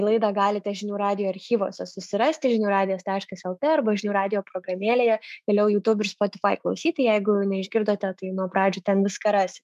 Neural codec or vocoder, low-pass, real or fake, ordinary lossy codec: autoencoder, 48 kHz, 128 numbers a frame, DAC-VAE, trained on Japanese speech; 14.4 kHz; fake; MP3, 96 kbps